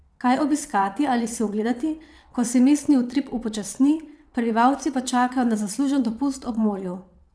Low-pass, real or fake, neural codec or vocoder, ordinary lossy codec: none; fake; vocoder, 22.05 kHz, 80 mel bands, WaveNeXt; none